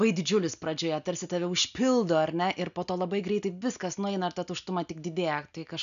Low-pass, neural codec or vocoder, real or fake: 7.2 kHz; none; real